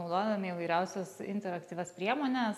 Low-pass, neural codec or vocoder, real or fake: 14.4 kHz; none; real